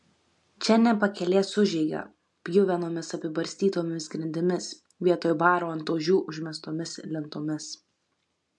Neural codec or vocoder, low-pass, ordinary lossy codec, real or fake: none; 10.8 kHz; MP3, 64 kbps; real